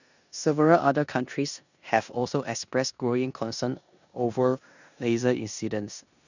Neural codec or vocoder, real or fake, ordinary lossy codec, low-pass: codec, 16 kHz in and 24 kHz out, 0.9 kbps, LongCat-Audio-Codec, four codebook decoder; fake; none; 7.2 kHz